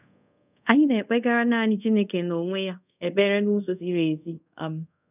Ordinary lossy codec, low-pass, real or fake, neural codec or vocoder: none; 3.6 kHz; fake; codec, 24 kHz, 0.5 kbps, DualCodec